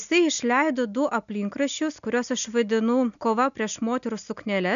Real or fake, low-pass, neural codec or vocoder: real; 7.2 kHz; none